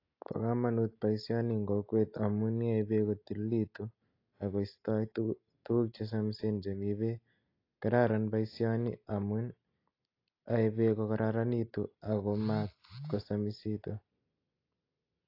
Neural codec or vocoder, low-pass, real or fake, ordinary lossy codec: none; 5.4 kHz; real; AAC, 32 kbps